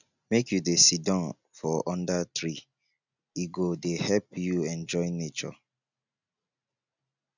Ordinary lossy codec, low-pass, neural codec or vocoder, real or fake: none; 7.2 kHz; none; real